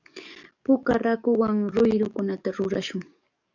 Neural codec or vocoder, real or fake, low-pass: codec, 44.1 kHz, 7.8 kbps, DAC; fake; 7.2 kHz